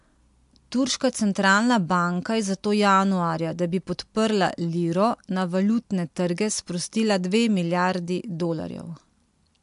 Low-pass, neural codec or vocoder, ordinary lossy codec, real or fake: 10.8 kHz; none; MP3, 64 kbps; real